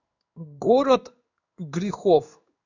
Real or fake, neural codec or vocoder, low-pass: fake; codec, 16 kHz in and 24 kHz out, 1 kbps, XY-Tokenizer; 7.2 kHz